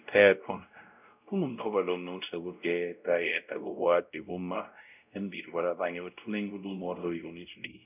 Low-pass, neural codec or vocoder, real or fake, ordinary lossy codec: 3.6 kHz; codec, 16 kHz, 0.5 kbps, X-Codec, WavLM features, trained on Multilingual LibriSpeech; fake; none